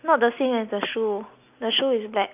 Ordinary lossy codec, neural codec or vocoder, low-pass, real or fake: none; none; 3.6 kHz; real